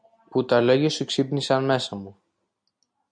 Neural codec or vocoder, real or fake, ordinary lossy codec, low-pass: none; real; MP3, 96 kbps; 9.9 kHz